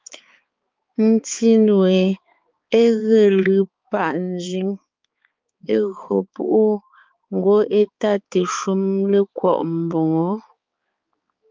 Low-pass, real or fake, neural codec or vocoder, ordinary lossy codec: 7.2 kHz; fake; codec, 16 kHz, 4 kbps, X-Codec, HuBERT features, trained on balanced general audio; Opus, 24 kbps